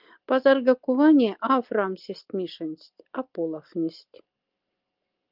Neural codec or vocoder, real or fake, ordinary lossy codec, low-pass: none; real; Opus, 32 kbps; 5.4 kHz